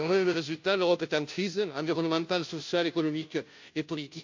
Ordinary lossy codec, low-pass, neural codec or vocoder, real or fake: MP3, 48 kbps; 7.2 kHz; codec, 16 kHz, 0.5 kbps, FunCodec, trained on Chinese and English, 25 frames a second; fake